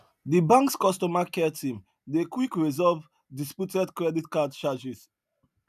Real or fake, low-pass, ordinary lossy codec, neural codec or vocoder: real; 14.4 kHz; none; none